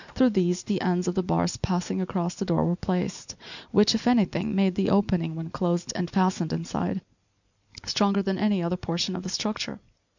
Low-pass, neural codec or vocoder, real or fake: 7.2 kHz; none; real